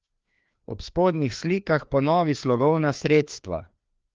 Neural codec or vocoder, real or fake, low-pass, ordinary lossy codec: codec, 16 kHz, 2 kbps, FreqCodec, larger model; fake; 7.2 kHz; Opus, 24 kbps